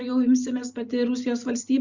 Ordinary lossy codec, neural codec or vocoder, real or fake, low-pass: Opus, 64 kbps; none; real; 7.2 kHz